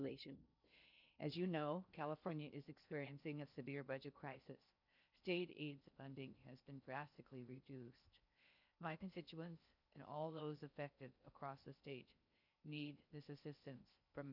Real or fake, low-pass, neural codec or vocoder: fake; 5.4 kHz; codec, 16 kHz in and 24 kHz out, 0.6 kbps, FocalCodec, streaming, 4096 codes